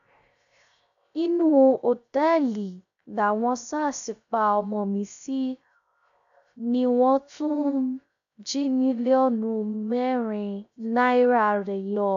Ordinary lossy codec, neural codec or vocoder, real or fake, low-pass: none; codec, 16 kHz, 0.3 kbps, FocalCodec; fake; 7.2 kHz